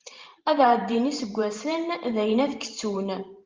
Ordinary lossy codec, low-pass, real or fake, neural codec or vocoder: Opus, 32 kbps; 7.2 kHz; fake; vocoder, 44.1 kHz, 128 mel bands every 512 samples, BigVGAN v2